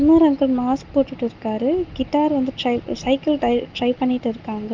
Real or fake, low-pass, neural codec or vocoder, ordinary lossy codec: real; 7.2 kHz; none; Opus, 32 kbps